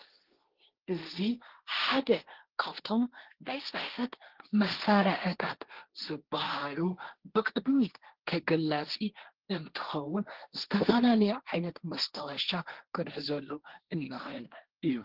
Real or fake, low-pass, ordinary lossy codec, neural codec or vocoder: fake; 5.4 kHz; Opus, 16 kbps; codec, 16 kHz, 1.1 kbps, Voila-Tokenizer